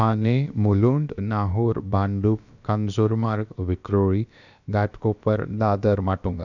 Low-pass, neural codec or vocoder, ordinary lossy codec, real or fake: 7.2 kHz; codec, 16 kHz, about 1 kbps, DyCAST, with the encoder's durations; none; fake